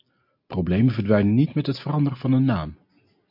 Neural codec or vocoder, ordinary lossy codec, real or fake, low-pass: none; MP3, 48 kbps; real; 5.4 kHz